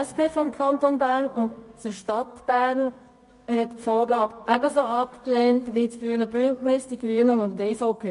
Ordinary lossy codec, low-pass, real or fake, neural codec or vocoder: MP3, 48 kbps; 10.8 kHz; fake; codec, 24 kHz, 0.9 kbps, WavTokenizer, medium music audio release